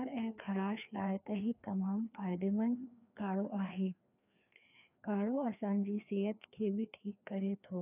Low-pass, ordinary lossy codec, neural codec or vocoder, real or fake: 3.6 kHz; none; codec, 16 kHz, 2 kbps, FreqCodec, larger model; fake